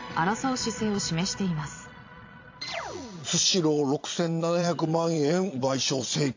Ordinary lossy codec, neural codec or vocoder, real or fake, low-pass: AAC, 48 kbps; vocoder, 22.05 kHz, 80 mel bands, Vocos; fake; 7.2 kHz